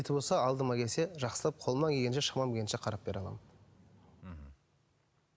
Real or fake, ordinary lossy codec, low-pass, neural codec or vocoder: real; none; none; none